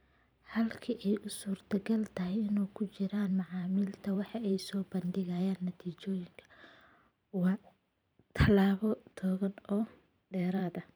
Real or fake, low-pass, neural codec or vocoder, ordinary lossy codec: fake; none; vocoder, 44.1 kHz, 128 mel bands every 512 samples, BigVGAN v2; none